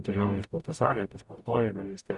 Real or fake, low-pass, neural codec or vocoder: fake; 10.8 kHz; codec, 44.1 kHz, 0.9 kbps, DAC